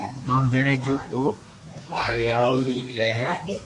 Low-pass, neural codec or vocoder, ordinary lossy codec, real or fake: 10.8 kHz; codec, 24 kHz, 1 kbps, SNAC; MP3, 48 kbps; fake